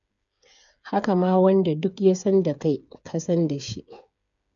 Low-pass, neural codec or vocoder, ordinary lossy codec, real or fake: 7.2 kHz; codec, 16 kHz, 8 kbps, FreqCodec, smaller model; none; fake